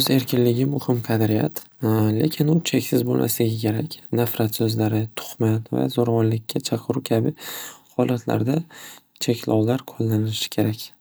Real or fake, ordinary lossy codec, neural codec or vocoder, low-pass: real; none; none; none